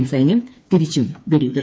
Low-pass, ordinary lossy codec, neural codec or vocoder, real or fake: none; none; codec, 16 kHz, 4 kbps, FreqCodec, smaller model; fake